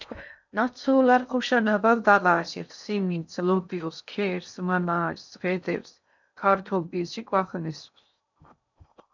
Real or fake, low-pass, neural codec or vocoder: fake; 7.2 kHz; codec, 16 kHz in and 24 kHz out, 0.6 kbps, FocalCodec, streaming, 2048 codes